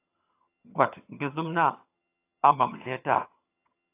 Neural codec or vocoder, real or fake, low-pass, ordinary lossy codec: vocoder, 22.05 kHz, 80 mel bands, HiFi-GAN; fake; 3.6 kHz; AAC, 24 kbps